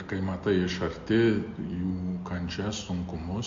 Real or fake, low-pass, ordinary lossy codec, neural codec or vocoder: real; 7.2 kHz; MP3, 48 kbps; none